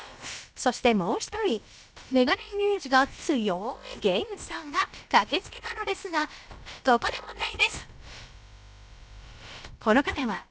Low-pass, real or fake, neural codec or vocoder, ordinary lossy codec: none; fake; codec, 16 kHz, about 1 kbps, DyCAST, with the encoder's durations; none